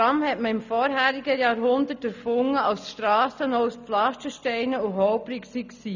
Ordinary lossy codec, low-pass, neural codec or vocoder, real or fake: none; 7.2 kHz; none; real